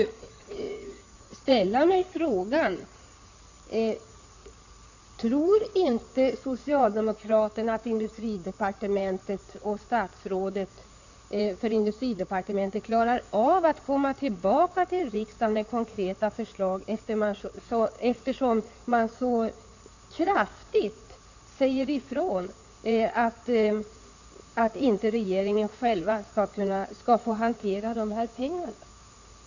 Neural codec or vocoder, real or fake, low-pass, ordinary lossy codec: codec, 16 kHz in and 24 kHz out, 2.2 kbps, FireRedTTS-2 codec; fake; 7.2 kHz; none